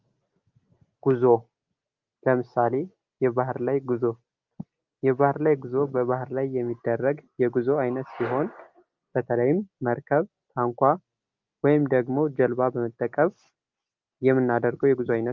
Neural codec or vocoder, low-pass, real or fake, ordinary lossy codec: none; 7.2 kHz; real; Opus, 32 kbps